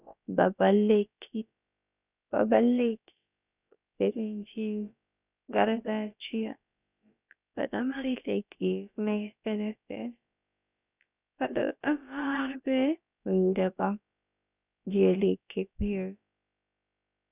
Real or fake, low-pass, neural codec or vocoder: fake; 3.6 kHz; codec, 16 kHz, about 1 kbps, DyCAST, with the encoder's durations